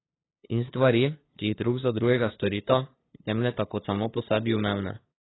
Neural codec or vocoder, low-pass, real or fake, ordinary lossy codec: codec, 16 kHz, 2 kbps, FunCodec, trained on LibriTTS, 25 frames a second; 7.2 kHz; fake; AAC, 16 kbps